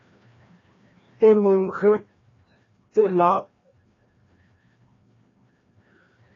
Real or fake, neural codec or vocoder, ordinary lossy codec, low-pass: fake; codec, 16 kHz, 1 kbps, FreqCodec, larger model; AAC, 32 kbps; 7.2 kHz